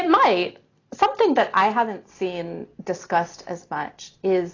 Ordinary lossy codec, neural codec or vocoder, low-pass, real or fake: AAC, 32 kbps; none; 7.2 kHz; real